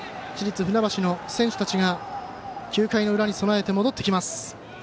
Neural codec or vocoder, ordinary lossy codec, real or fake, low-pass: none; none; real; none